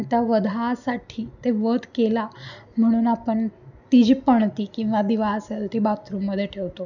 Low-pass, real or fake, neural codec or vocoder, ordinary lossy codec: 7.2 kHz; real; none; none